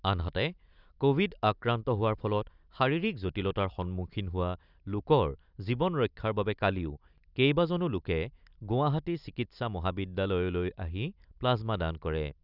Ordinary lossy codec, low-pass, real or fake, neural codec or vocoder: none; 5.4 kHz; real; none